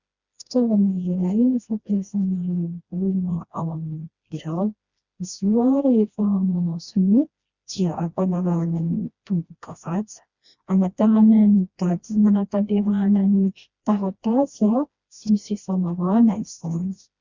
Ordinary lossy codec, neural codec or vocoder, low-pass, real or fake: Opus, 64 kbps; codec, 16 kHz, 1 kbps, FreqCodec, smaller model; 7.2 kHz; fake